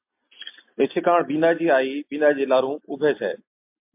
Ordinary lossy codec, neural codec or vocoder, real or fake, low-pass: MP3, 32 kbps; none; real; 3.6 kHz